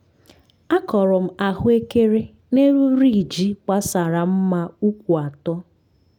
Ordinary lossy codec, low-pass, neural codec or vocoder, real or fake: none; 19.8 kHz; none; real